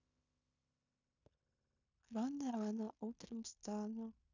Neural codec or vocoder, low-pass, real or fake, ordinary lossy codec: codec, 16 kHz in and 24 kHz out, 0.9 kbps, LongCat-Audio-Codec, fine tuned four codebook decoder; 7.2 kHz; fake; none